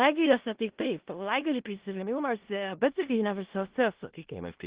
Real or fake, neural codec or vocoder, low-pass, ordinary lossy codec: fake; codec, 16 kHz in and 24 kHz out, 0.4 kbps, LongCat-Audio-Codec, four codebook decoder; 3.6 kHz; Opus, 16 kbps